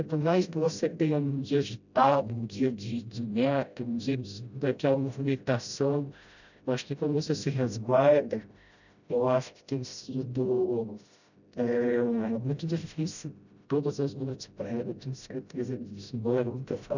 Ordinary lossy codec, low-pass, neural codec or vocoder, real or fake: none; 7.2 kHz; codec, 16 kHz, 0.5 kbps, FreqCodec, smaller model; fake